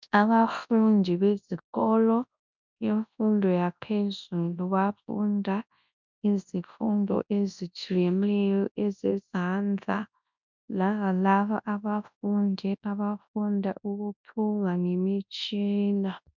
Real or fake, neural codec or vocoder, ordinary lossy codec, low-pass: fake; codec, 24 kHz, 0.9 kbps, WavTokenizer, large speech release; MP3, 64 kbps; 7.2 kHz